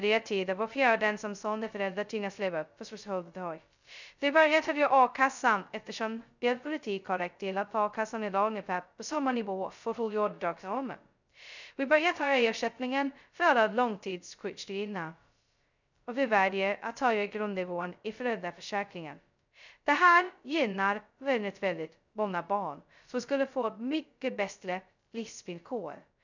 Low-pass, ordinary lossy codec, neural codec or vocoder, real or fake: 7.2 kHz; none; codec, 16 kHz, 0.2 kbps, FocalCodec; fake